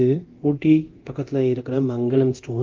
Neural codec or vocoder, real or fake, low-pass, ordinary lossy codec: codec, 24 kHz, 0.9 kbps, DualCodec; fake; 7.2 kHz; Opus, 32 kbps